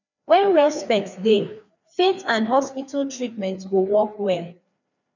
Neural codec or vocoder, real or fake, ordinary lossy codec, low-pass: codec, 16 kHz, 2 kbps, FreqCodec, larger model; fake; none; 7.2 kHz